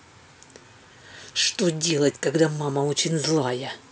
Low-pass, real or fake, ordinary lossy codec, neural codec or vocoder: none; real; none; none